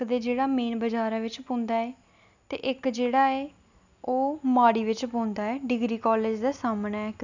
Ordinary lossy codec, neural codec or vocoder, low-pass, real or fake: none; none; 7.2 kHz; real